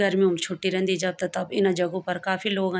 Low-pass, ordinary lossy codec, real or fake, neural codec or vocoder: none; none; real; none